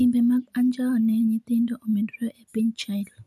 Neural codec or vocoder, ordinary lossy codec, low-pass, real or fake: none; none; 14.4 kHz; real